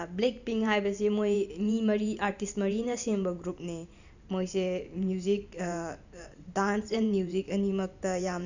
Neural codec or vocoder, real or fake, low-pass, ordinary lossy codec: vocoder, 44.1 kHz, 128 mel bands every 512 samples, BigVGAN v2; fake; 7.2 kHz; none